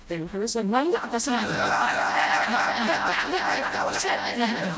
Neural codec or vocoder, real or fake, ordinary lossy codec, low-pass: codec, 16 kHz, 0.5 kbps, FreqCodec, smaller model; fake; none; none